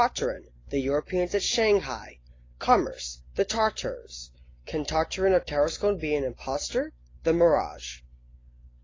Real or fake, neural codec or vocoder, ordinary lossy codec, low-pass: real; none; AAC, 32 kbps; 7.2 kHz